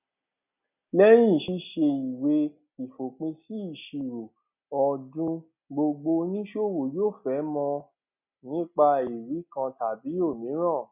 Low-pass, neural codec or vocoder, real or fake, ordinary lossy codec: 3.6 kHz; none; real; none